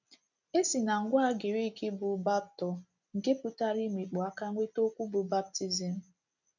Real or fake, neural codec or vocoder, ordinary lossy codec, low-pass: real; none; none; 7.2 kHz